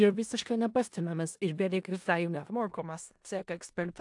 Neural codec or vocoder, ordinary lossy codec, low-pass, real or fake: codec, 16 kHz in and 24 kHz out, 0.4 kbps, LongCat-Audio-Codec, four codebook decoder; AAC, 64 kbps; 10.8 kHz; fake